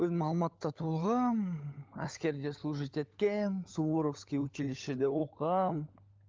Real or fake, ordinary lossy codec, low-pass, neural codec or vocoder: fake; Opus, 32 kbps; 7.2 kHz; codec, 16 kHz, 16 kbps, FunCodec, trained on LibriTTS, 50 frames a second